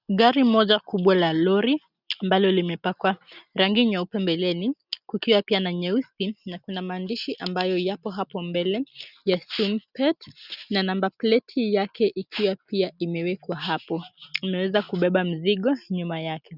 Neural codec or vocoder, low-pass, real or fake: none; 5.4 kHz; real